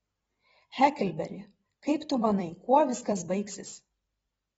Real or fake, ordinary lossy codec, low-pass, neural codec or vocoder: fake; AAC, 24 kbps; 19.8 kHz; vocoder, 44.1 kHz, 128 mel bands every 256 samples, BigVGAN v2